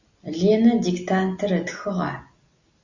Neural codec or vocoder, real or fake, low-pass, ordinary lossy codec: none; real; 7.2 kHz; Opus, 64 kbps